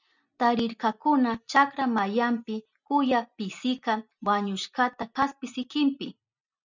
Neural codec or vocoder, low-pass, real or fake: none; 7.2 kHz; real